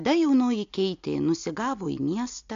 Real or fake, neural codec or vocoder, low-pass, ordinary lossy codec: real; none; 7.2 kHz; AAC, 64 kbps